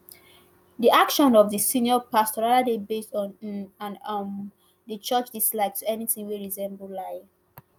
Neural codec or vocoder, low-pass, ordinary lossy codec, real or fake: none; none; none; real